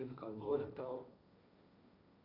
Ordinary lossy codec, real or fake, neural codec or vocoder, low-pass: none; fake; codec, 16 kHz, 1.1 kbps, Voila-Tokenizer; 5.4 kHz